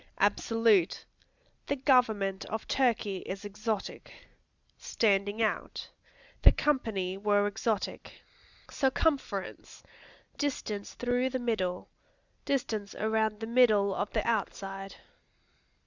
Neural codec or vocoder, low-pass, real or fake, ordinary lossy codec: none; 7.2 kHz; real; Opus, 64 kbps